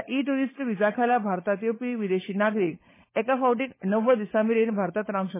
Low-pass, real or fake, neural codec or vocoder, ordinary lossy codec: 3.6 kHz; fake; codec, 16 kHz, 16 kbps, FunCodec, trained on LibriTTS, 50 frames a second; MP3, 16 kbps